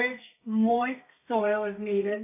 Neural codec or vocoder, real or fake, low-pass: codec, 32 kHz, 1.9 kbps, SNAC; fake; 3.6 kHz